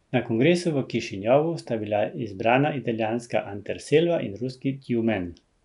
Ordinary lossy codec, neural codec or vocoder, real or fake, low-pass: none; none; real; 10.8 kHz